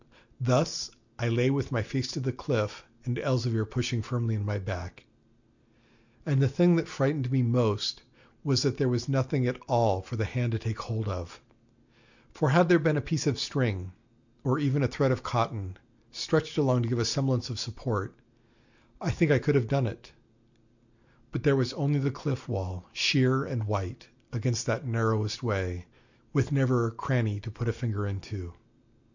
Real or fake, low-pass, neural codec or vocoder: real; 7.2 kHz; none